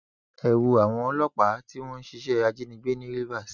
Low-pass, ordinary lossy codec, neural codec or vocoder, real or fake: 7.2 kHz; none; none; real